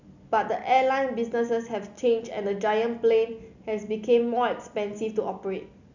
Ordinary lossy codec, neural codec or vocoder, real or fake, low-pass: none; none; real; 7.2 kHz